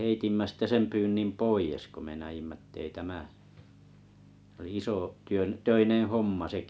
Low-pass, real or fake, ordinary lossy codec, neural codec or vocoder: none; real; none; none